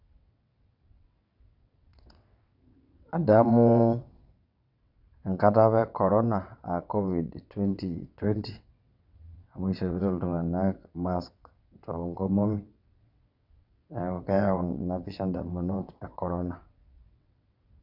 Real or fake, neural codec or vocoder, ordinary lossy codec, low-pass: fake; vocoder, 22.05 kHz, 80 mel bands, WaveNeXt; none; 5.4 kHz